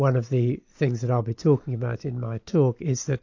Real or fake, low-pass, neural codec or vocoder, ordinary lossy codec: real; 7.2 kHz; none; AAC, 48 kbps